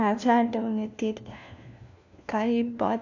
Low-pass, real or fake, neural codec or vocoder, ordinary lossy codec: 7.2 kHz; fake; codec, 16 kHz, 1 kbps, FunCodec, trained on LibriTTS, 50 frames a second; AAC, 48 kbps